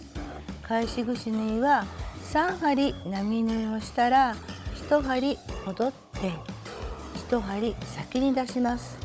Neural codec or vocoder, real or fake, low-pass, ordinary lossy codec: codec, 16 kHz, 16 kbps, FunCodec, trained on Chinese and English, 50 frames a second; fake; none; none